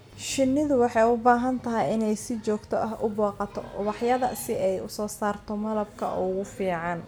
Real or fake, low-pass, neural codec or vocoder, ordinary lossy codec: real; none; none; none